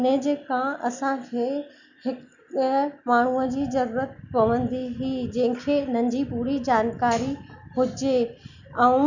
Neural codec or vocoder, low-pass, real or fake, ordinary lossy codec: none; 7.2 kHz; real; none